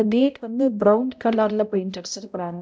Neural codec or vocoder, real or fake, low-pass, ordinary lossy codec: codec, 16 kHz, 0.5 kbps, X-Codec, HuBERT features, trained on balanced general audio; fake; none; none